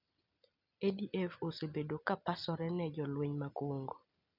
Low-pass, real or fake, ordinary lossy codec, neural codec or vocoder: 5.4 kHz; real; none; none